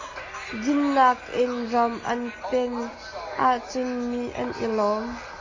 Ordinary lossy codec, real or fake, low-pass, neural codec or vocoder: AAC, 32 kbps; real; 7.2 kHz; none